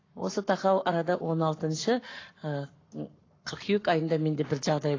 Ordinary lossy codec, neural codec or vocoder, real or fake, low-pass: AAC, 32 kbps; codec, 44.1 kHz, 7.8 kbps, Pupu-Codec; fake; 7.2 kHz